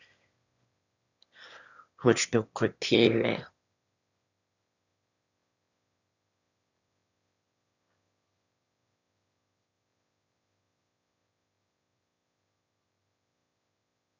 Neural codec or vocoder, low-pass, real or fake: autoencoder, 22.05 kHz, a latent of 192 numbers a frame, VITS, trained on one speaker; 7.2 kHz; fake